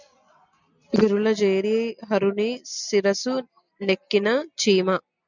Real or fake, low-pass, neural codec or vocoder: real; 7.2 kHz; none